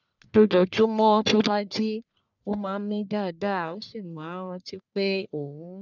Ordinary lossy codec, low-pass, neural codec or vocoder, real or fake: none; 7.2 kHz; codec, 44.1 kHz, 1.7 kbps, Pupu-Codec; fake